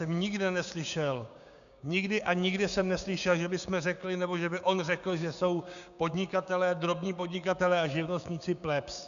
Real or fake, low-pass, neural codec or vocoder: fake; 7.2 kHz; codec, 16 kHz, 6 kbps, DAC